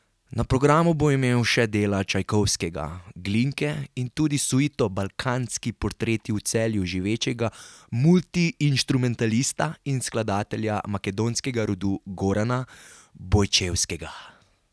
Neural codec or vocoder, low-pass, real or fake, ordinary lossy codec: none; none; real; none